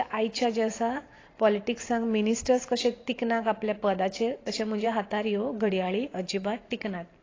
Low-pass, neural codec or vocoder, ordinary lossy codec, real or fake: 7.2 kHz; vocoder, 44.1 kHz, 128 mel bands every 512 samples, BigVGAN v2; AAC, 32 kbps; fake